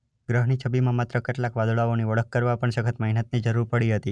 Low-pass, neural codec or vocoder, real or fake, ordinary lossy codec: 9.9 kHz; none; real; none